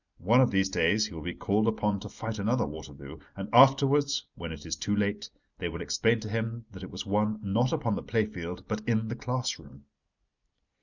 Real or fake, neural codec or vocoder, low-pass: real; none; 7.2 kHz